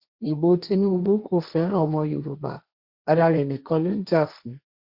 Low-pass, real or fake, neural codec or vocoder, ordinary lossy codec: 5.4 kHz; fake; codec, 16 kHz, 1.1 kbps, Voila-Tokenizer; Opus, 64 kbps